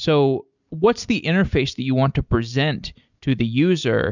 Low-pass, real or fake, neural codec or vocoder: 7.2 kHz; real; none